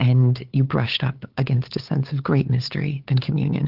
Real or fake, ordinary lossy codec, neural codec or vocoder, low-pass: fake; Opus, 16 kbps; codec, 16 kHz, 8 kbps, FunCodec, trained on LibriTTS, 25 frames a second; 5.4 kHz